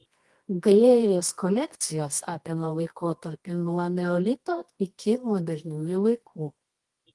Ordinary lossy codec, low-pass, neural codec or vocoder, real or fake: Opus, 24 kbps; 10.8 kHz; codec, 24 kHz, 0.9 kbps, WavTokenizer, medium music audio release; fake